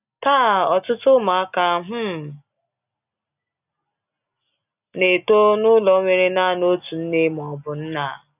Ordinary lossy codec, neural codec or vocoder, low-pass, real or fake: none; none; 3.6 kHz; real